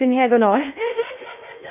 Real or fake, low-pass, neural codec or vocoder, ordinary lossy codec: fake; 3.6 kHz; codec, 16 kHz in and 24 kHz out, 0.6 kbps, FocalCodec, streaming, 4096 codes; none